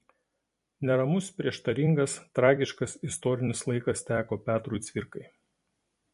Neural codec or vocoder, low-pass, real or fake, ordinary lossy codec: vocoder, 44.1 kHz, 128 mel bands every 256 samples, BigVGAN v2; 14.4 kHz; fake; MP3, 48 kbps